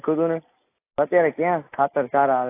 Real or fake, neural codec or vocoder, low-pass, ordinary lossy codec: real; none; 3.6 kHz; AAC, 24 kbps